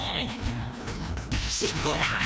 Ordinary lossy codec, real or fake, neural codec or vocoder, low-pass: none; fake; codec, 16 kHz, 1 kbps, FreqCodec, larger model; none